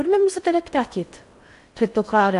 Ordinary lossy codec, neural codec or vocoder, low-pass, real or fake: MP3, 96 kbps; codec, 16 kHz in and 24 kHz out, 0.6 kbps, FocalCodec, streaming, 4096 codes; 10.8 kHz; fake